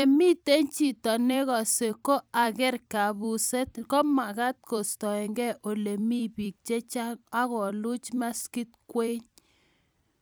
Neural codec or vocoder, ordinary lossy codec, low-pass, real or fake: vocoder, 44.1 kHz, 128 mel bands every 256 samples, BigVGAN v2; none; none; fake